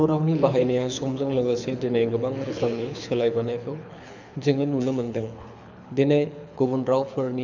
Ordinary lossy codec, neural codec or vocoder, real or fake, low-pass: none; codec, 24 kHz, 6 kbps, HILCodec; fake; 7.2 kHz